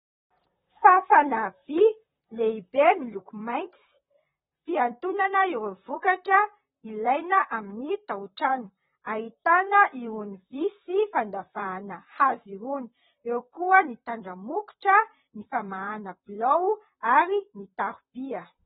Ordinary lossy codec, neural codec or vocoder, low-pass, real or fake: AAC, 16 kbps; vocoder, 44.1 kHz, 128 mel bands, Pupu-Vocoder; 19.8 kHz; fake